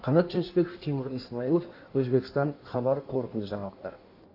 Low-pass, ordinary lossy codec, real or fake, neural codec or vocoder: 5.4 kHz; AAC, 32 kbps; fake; codec, 16 kHz in and 24 kHz out, 1.1 kbps, FireRedTTS-2 codec